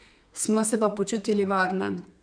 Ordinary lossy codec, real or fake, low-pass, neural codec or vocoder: none; fake; 9.9 kHz; codec, 32 kHz, 1.9 kbps, SNAC